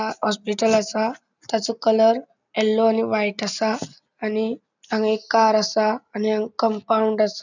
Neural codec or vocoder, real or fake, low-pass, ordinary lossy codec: none; real; 7.2 kHz; none